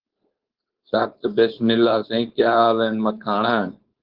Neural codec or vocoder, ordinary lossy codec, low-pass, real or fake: codec, 16 kHz, 4.8 kbps, FACodec; Opus, 16 kbps; 5.4 kHz; fake